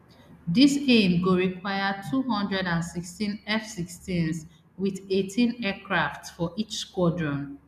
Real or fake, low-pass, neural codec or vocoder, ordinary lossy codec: real; 14.4 kHz; none; MP3, 96 kbps